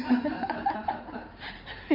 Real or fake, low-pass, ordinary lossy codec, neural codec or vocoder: fake; 5.4 kHz; none; codec, 16 kHz in and 24 kHz out, 2.2 kbps, FireRedTTS-2 codec